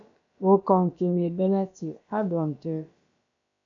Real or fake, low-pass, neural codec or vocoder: fake; 7.2 kHz; codec, 16 kHz, about 1 kbps, DyCAST, with the encoder's durations